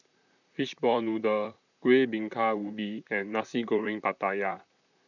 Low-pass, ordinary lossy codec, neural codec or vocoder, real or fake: 7.2 kHz; none; vocoder, 44.1 kHz, 128 mel bands, Pupu-Vocoder; fake